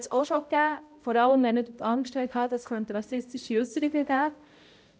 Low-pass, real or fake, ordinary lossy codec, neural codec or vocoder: none; fake; none; codec, 16 kHz, 0.5 kbps, X-Codec, HuBERT features, trained on balanced general audio